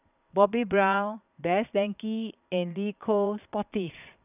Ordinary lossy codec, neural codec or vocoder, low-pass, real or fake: none; vocoder, 22.05 kHz, 80 mel bands, Vocos; 3.6 kHz; fake